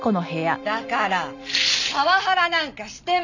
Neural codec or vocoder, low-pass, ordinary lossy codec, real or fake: none; 7.2 kHz; none; real